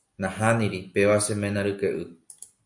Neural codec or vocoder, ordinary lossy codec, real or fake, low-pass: none; MP3, 64 kbps; real; 10.8 kHz